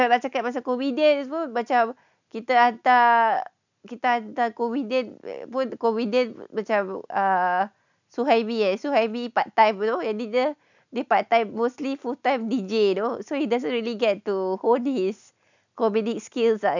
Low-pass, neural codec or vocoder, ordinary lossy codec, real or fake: 7.2 kHz; none; none; real